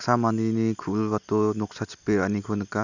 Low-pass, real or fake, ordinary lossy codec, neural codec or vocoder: 7.2 kHz; real; none; none